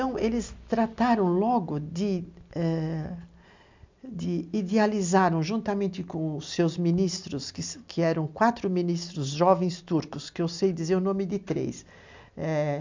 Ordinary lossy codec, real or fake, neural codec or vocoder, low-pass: MP3, 64 kbps; real; none; 7.2 kHz